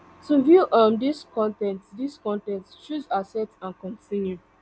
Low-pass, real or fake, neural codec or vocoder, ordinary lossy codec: none; real; none; none